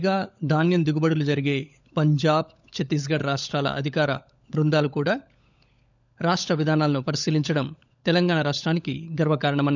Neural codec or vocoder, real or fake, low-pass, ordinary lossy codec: codec, 16 kHz, 16 kbps, FunCodec, trained on LibriTTS, 50 frames a second; fake; 7.2 kHz; none